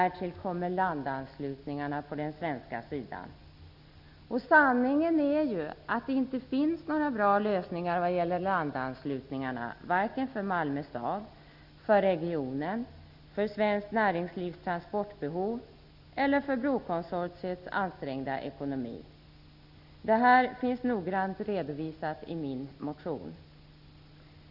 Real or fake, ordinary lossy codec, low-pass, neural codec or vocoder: real; none; 5.4 kHz; none